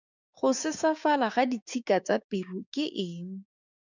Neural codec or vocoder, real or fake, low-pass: codec, 16 kHz, 6 kbps, DAC; fake; 7.2 kHz